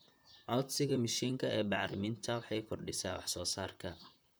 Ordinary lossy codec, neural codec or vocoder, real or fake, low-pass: none; vocoder, 44.1 kHz, 128 mel bands, Pupu-Vocoder; fake; none